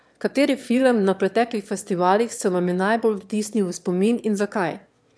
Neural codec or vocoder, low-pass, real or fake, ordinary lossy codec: autoencoder, 22.05 kHz, a latent of 192 numbers a frame, VITS, trained on one speaker; none; fake; none